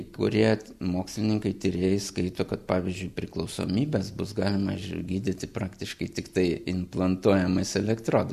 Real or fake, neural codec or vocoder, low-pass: real; none; 14.4 kHz